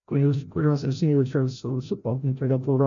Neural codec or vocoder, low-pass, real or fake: codec, 16 kHz, 0.5 kbps, FreqCodec, larger model; 7.2 kHz; fake